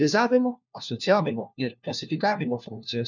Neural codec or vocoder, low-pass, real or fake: codec, 16 kHz, 1 kbps, FunCodec, trained on LibriTTS, 50 frames a second; 7.2 kHz; fake